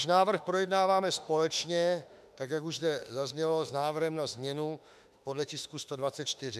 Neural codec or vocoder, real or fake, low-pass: autoencoder, 48 kHz, 32 numbers a frame, DAC-VAE, trained on Japanese speech; fake; 14.4 kHz